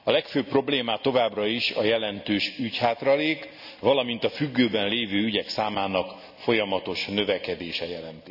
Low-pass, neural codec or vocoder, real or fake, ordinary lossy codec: 5.4 kHz; none; real; none